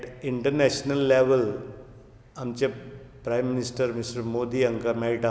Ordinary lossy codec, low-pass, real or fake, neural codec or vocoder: none; none; real; none